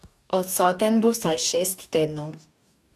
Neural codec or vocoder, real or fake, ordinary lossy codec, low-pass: codec, 44.1 kHz, 2.6 kbps, DAC; fake; none; 14.4 kHz